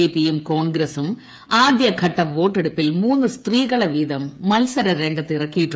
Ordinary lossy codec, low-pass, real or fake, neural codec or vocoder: none; none; fake; codec, 16 kHz, 8 kbps, FreqCodec, smaller model